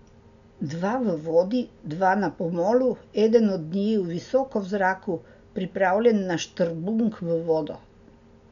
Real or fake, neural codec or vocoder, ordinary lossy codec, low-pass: real; none; none; 7.2 kHz